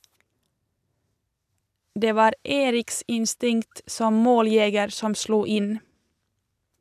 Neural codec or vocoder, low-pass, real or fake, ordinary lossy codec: none; 14.4 kHz; real; none